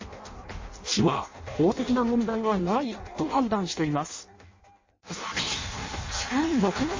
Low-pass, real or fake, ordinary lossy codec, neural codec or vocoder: 7.2 kHz; fake; MP3, 32 kbps; codec, 16 kHz in and 24 kHz out, 0.6 kbps, FireRedTTS-2 codec